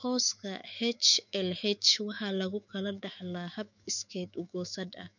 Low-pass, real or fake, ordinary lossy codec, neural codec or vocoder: 7.2 kHz; fake; none; autoencoder, 48 kHz, 128 numbers a frame, DAC-VAE, trained on Japanese speech